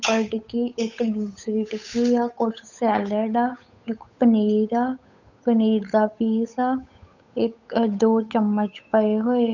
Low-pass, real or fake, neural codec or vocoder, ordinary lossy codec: 7.2 kHz; fake; codec, 16 kHz, 8 kbps, FunCodec, trained on Chinese and English, 25 frames a second; none